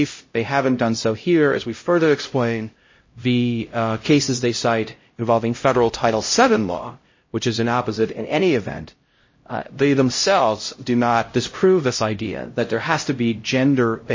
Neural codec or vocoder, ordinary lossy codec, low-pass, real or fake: codec, 16 kHz, 0.5 kbps, X-Codec, HuBERT features, trained on LibriSpeech; MP3, 32 kbps; 7.2 kHz; fake